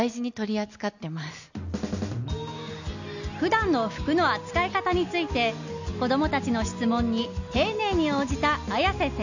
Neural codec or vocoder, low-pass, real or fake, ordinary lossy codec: none; 7.2 kHz; real; none